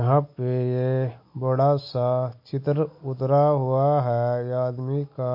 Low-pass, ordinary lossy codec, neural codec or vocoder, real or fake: 5.4 kHz; MP3, 32 kbps; none; real